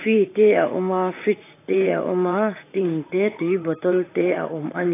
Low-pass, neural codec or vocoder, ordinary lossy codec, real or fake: 3.6 kHz; vocoder, 44.1 kHz, 128 mel bands, Pupu-Vocoder; AAC, 24 kbps; fake